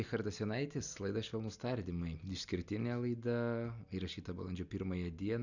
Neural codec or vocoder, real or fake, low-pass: none; real; 7.2 kHz